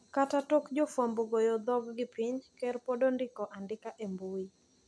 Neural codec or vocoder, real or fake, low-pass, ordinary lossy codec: none; real; none; none